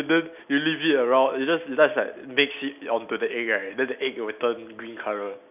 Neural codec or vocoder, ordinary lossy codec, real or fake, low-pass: none; none; real; 3.6 kHz